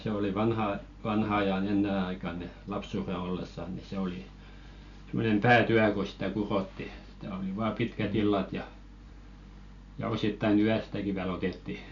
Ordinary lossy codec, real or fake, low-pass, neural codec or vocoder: none; real; 7.2 kHz; none